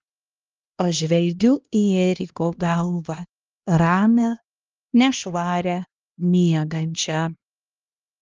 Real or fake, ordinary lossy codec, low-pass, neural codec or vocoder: fake; Opus, 24 kbps; 7.2 kHz; codec, 16 kHz, 1 kbps, X-Codec, HuBERT features, trained on LibriSpeech